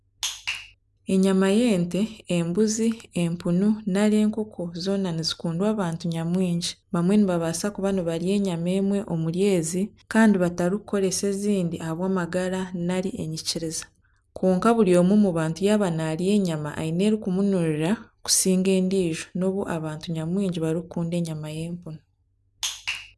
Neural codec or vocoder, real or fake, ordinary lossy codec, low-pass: none; real; none; none